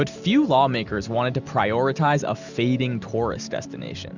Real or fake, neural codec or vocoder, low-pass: real; none; 7.2 kHz